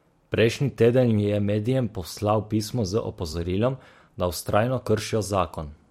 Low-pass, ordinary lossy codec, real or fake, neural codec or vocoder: 19.8 kHz; MP3, 64 kbps; fake; vocoder, 44.1 kHz, 128 mel bands every 256 samples, BigVGAN v2